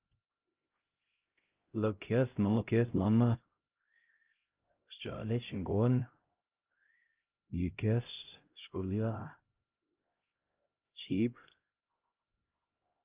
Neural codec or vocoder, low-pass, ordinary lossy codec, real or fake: codec, 16 kHz, 0.5 kbps, X-Codec, HuBERT features, trained on LibriSpeech; 3.6 kHz; Opus, 32 kbps; fake